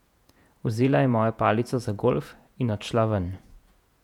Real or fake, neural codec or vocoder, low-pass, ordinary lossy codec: fake; vocoder, 48 kHz, 128 mel bands, Vocos; 19.8 kHz; none